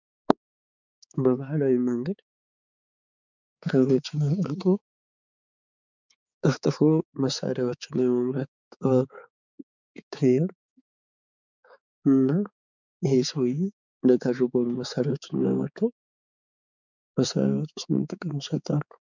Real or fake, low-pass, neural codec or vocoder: fake; 7.2 kHz; codec, 16 kHz, 4 kbps, X-Codec, HuBERT features, trained on balanced general audio